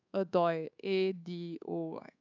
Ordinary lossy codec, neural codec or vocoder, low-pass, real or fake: none; codec, 24 kHz, 1.2 kbps, DualCodec; 7.2 kHz; fake